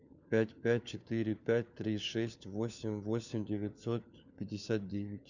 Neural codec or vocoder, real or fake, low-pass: codec, 16 kHz, 4 kbps, FunCodec, trained on LibriTTS, 50 frames a second; fake; 7.2 kHz